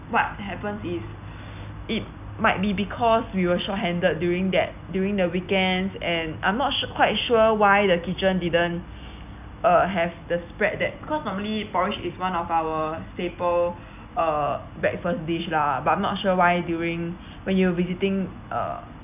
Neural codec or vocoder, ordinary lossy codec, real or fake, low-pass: none; none; real; 3.6 kHz